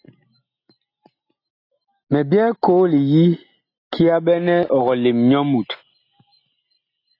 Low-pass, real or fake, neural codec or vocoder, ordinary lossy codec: 5.4 kHz; real; none; AAC, 32 kbps